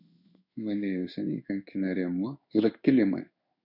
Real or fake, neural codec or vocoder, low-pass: fake; codec, 16 kHz in and 24 kHz out, 1 kbps, XY-Tokenizer; 5.4 kHz